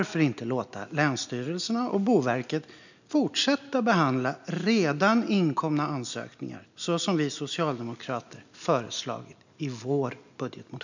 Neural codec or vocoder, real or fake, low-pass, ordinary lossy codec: none; real; 7.2 kHz; none